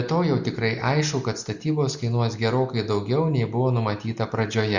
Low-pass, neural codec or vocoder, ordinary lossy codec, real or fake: 7.2 kHz; none; Opus, 64 kbps; real